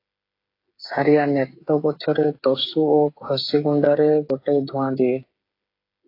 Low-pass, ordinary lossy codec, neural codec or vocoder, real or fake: 5.4 kHz; AAC, 32 kbps; codec, 16 kHz, 8 kbps, FreqCodec, smaller model; fake